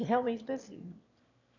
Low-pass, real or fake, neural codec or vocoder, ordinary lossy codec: 7.2 kHz; fake; autoencoder, 22.05 kHz, a latent of 192 numbers a frame, VITS, trained on one speaker; none